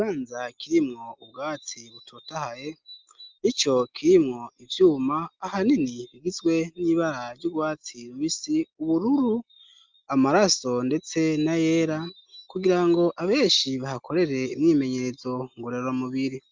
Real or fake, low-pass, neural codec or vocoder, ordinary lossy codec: real; 7.2 kHz; none; Opus, 24 kbps